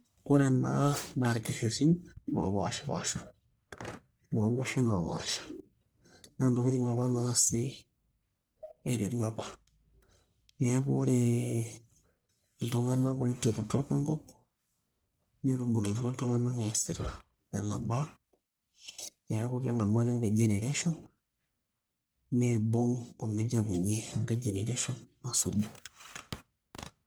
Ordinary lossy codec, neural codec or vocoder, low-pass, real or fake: none; codec, 44.1 kHz, 1.7 kbps, Pupu-Codec; none; fake